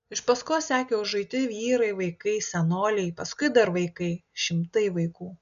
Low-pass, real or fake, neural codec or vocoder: 7.2 kHz; real; none